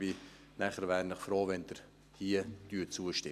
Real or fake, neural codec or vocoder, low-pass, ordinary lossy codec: real; none; 14.4 kHz; none